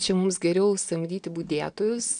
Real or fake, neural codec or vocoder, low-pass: real; none; 9.9 kHz